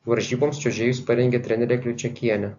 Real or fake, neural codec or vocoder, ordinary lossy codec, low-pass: real; none; AAC, 64 kbps; 7.2 kHz